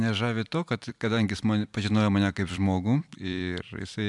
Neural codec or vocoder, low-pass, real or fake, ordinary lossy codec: vocoder, 44.1 kHz, 128 mel bands every 256 samples, BigVGAN v2; 10.8 kHz; fake; MP3, 96 kbps